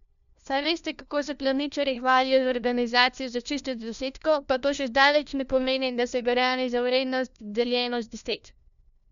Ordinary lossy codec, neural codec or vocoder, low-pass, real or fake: none; codec, 16 kHz, 1 kbps, FunCodec, trained on LibriTTS, 50 frames a second; 7.2 kHz; fake